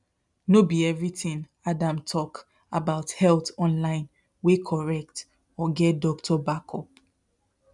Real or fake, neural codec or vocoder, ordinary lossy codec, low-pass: real; none; none; 10.8 kHz